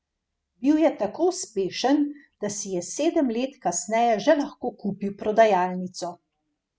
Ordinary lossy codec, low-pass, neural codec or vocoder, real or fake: none; none; none; real